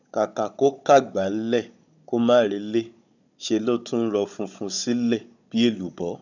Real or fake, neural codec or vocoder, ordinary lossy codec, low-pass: fake; codec, 16 kHz, 16 kbps, FunCodec, trained on Chinese and English, 50 frames a second; none; 7.2 kHz